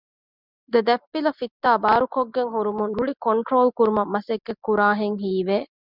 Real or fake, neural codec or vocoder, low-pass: real; none; 5.4 kHz